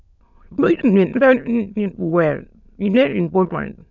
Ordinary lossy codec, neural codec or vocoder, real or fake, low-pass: none; autoencoder, 22.05 kHz, a latent of 192 numbers a frame, VITS, trained on many speakers; fake; 7.2 kHz